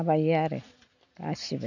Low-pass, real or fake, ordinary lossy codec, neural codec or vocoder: 7.2 kHz; real; none; none